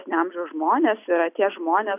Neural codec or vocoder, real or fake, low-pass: none; real; 3.6 kHz